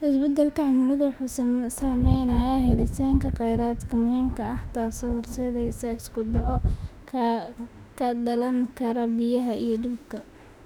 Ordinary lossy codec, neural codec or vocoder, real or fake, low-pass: none; autoencoder, 48 kHz, 32 numbers a frame, DAC-VAE, trained on Japanese speech; fake; 19.8 kHz